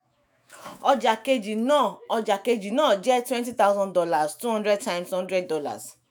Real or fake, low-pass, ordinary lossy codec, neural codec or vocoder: fake; none; none; autoencoder, 48 kHz, 128 numbers a frame, DAC-VAE, trained on Japanese speech